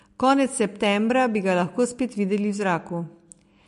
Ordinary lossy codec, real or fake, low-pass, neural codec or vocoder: MP3, 48 kbps; real; 14.4 kHz; none